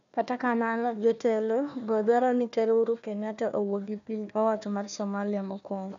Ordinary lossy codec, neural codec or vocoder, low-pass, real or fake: none; codec, 16 kHz, 1 kbps, FunCodec, trained on Chinese and English, 50 frames a second; 7.2 kHz; fake